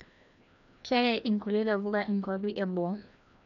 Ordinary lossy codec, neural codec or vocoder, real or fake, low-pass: none; codec, 16 kHz, 1 kbps, FreqCodec, larger model; fake; 7.2 kHz